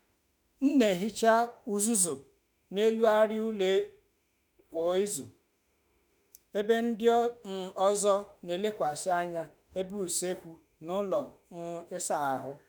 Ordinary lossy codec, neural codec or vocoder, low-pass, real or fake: none; autoencoder, 48 kHz, 32 numbers a frame, DAC-VAE, trained on Japanese speech; none; fake